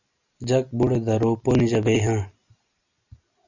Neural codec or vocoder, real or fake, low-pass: none; real; 7.2 kHz